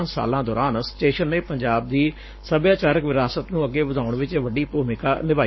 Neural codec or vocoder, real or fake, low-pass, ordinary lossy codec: codec, 44.1 kHz, 7.8 kbps, Pupu-Codec; fake; 7.2 kHz; MP3, 24 kbps